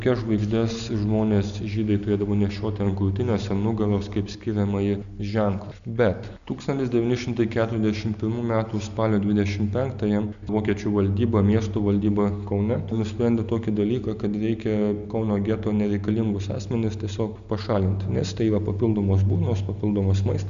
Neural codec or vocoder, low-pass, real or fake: none; 7.2 kHz; real